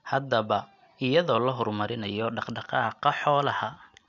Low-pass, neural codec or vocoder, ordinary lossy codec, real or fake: 7.2 kHz; none; none; real